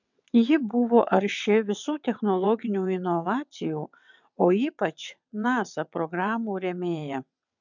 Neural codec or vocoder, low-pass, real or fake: vocoder, 22.05 kHz, 80 mel bands, WaveNeXt; 7.2 kHz; fake